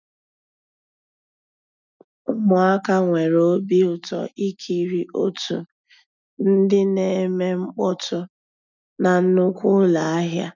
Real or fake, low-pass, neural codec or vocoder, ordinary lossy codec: real; 7.2 kHz; none; none